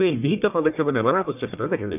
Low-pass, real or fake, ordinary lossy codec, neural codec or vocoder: 3.6 kHz; fake; none; codec, 44.1 kHz, 1.7 kbps, Pupu-Codec